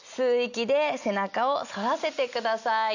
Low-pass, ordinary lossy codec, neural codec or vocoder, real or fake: 7.2 kHz; none; none; real